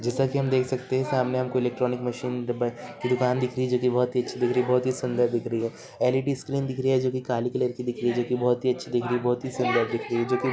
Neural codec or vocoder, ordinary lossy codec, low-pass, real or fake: none; none; none; real